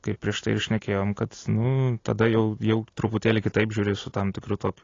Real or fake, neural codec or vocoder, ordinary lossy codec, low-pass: real; none; AAC, 32 kbps; 7.2 kHz